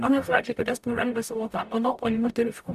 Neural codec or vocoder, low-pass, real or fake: codec, 44.1 kHz, 0.9 kbps, DAC; 14.4 kHz; fake